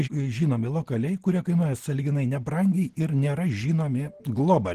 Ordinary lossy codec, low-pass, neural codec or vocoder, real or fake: Opus, 16 kbps; 14.4 kHz; none; real